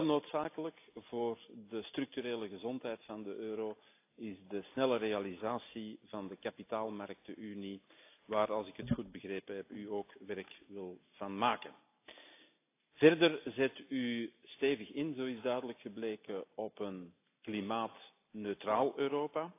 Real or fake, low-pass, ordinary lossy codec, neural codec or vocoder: real; 3.6 kHz; AAC, 24 kbps; none